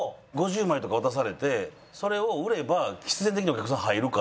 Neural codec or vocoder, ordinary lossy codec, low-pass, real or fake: none; none; none; real